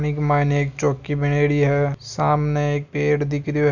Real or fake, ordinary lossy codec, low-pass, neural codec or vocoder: real; none; 7.2 kHz; none